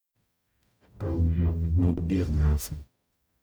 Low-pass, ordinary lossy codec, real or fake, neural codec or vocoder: none; none; fake; codec, 44.1 kHz, 0.9 kbps, DAC